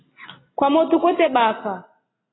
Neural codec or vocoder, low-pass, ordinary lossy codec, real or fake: none; 7.2 kHz; AAC, 16 kbps; real